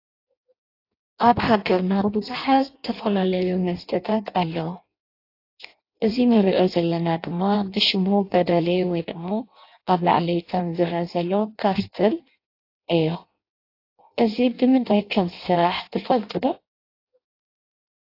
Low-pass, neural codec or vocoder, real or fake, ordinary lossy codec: 5.4 kHz; codec, 16 kHz in and 24 kHz out, 0.6 kbps, FireRedTTS-2 codec; fake; AAC, 32 kbps